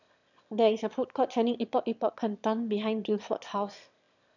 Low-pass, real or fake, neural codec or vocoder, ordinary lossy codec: 7.2 kHz; fake; autoencoder, 22.05 kHz, a latent of 192 numbers a frame, VITS, trained on one speaker; none